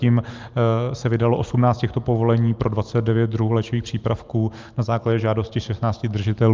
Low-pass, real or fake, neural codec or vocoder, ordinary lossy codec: 7.2 kHz; real; none; Opus, 32 kbps